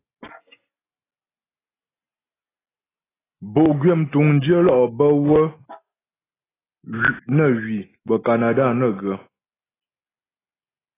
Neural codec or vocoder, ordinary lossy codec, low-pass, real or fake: none; AAC, 16 kbps; 3.6 kHz; real